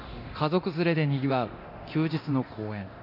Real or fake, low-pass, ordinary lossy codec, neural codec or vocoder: fake; 5.4 kHz; none; codec, 24 kHz, 0.9 kbps, DualCodec